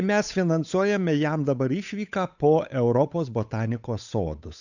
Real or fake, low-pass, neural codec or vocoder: fake; 7.2 kHz; codec, 16 kHz, 8 kbps, FunCodec, trained on Chinese and English, 25 frames a second